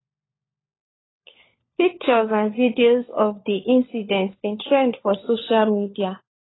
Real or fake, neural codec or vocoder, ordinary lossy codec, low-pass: fake; codec, 16 kHz, 4 kbps, FunCodec, trained on LibriTTS, 50 frames a second; AAC, 16 kbps; 7.2 kHz